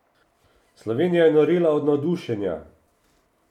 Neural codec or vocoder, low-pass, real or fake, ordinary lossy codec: none; 19.8 kHz; real; none